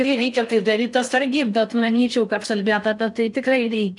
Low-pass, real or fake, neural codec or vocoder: 10.8 kHz; fake; codec, 16 kHz in and 24 kHz out, 0.6 kbps, FocalCodec, streaming, 4096 codes